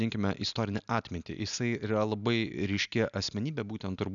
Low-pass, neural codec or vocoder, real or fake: 7.2 kHz; none; real